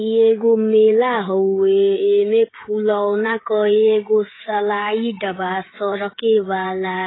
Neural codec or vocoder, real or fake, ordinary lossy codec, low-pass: codec, 16 kHz, 16 kbps, FreqCodec, smaller model; fake; AAC, 16 kbps; 7.2 kHz